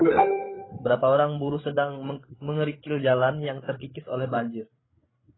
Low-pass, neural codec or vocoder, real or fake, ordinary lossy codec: 7.2 kHz; codec, 16 kHz, 8 kbps, FreqCodec, larger model; fake; AAC, 16 kbps